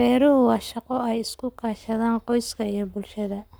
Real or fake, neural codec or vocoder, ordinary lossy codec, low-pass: fake; codec, 44.1 kHz, 7.8 kbps, Pupu-Codec; none; none